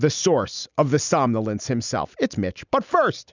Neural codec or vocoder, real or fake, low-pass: none; real; 7.2 kHz